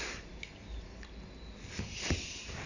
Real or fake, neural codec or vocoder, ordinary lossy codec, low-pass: real; none; none; 7.2 kHz